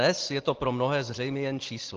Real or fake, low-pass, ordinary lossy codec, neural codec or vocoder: real; 7.2 kHz; Opus, 16 kbps; none